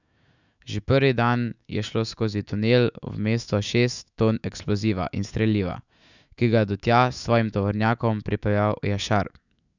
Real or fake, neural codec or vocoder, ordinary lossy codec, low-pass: fake; autoencoder, 48 kHz, 128 numbers a frame, DAC-VAE, trained on Japanese speech; none; 7.2 kHz